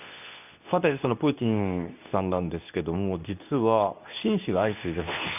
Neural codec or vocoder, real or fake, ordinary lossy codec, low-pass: codec, 24 kHz, 0.9 kbps, WavTokenizer, medium speech release version 2; fake; none; 3.6 kHz